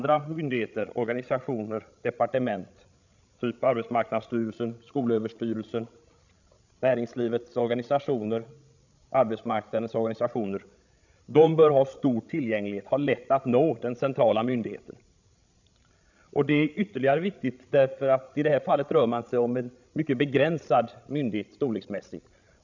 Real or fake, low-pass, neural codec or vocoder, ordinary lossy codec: fake; 7.2 kHz; codec, 16 kHz, 16 kbps, FreqCodec, larger model; none